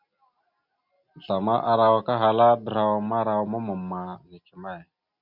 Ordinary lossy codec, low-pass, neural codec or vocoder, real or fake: Opus, 64 kbps; 5.4 kHz; none; real